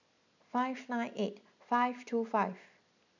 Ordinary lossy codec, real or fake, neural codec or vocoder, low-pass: none; real; none; 7.2 kHz